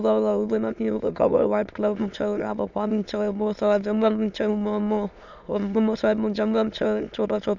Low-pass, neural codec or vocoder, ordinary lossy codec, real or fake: 7.2 kHz; autoencoder, 22.05 kHz, a latent of 192 numbers a frame, VITS, trained on many speakers; none; fake